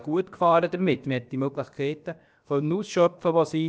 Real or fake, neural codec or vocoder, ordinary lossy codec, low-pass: fake; codec, 16 kHz, about 1 kbps, DyCAST, with the encoder's durations; none; none